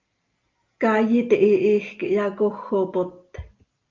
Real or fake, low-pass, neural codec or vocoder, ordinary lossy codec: real; 7.2 kHz; none; Opus, 32 kbps